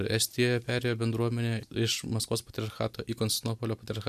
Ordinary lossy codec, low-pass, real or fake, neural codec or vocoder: MP3, 96 kbps; 14.4 kHz; real; none